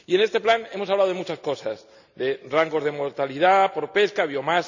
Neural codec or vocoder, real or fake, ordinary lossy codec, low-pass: none; real; none; 7.2 kHz